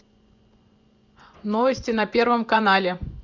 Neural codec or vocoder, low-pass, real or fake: vocoder, 24 kHz, 100 mel bands, Vocos; 7.2 kHz; fake